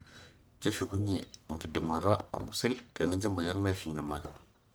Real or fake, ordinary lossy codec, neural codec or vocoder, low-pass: fake; none; codec, 44.1 kHz, 1.7 kbps, Pupu-Codec; none